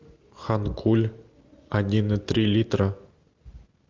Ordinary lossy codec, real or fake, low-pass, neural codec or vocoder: Opus, 24 kbps; real; 7.2 kHz; none